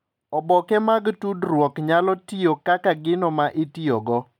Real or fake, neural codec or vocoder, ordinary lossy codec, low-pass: real; none; none; 19.8 kHz